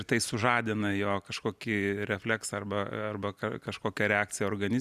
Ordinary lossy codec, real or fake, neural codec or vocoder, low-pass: Opus, 64 kbps; real; none; 14.4 kHz